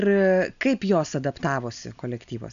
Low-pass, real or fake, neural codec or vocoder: 7.2 kHz; real; none